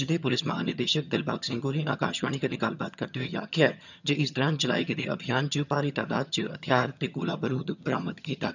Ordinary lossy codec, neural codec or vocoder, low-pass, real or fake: none; vocoder, 22.05 kHz, 80 mel bands, HiFi-GAN; 7.2 kHz; fake